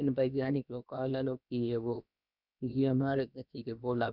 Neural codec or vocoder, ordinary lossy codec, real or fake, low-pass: codec, 16 kHz, about 1 kbps, DyCAST, with the encoder's durations; none; fake; 5.4 kHz